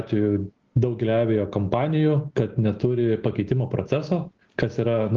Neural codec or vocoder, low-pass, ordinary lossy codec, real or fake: none; 7.2 kHz; Opus, 16 kbps; real